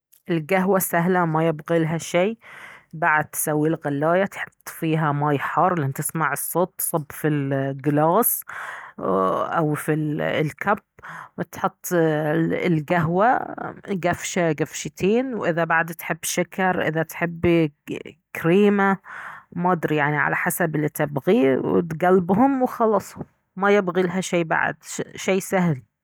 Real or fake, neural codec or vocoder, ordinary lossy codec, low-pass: real; none; none; none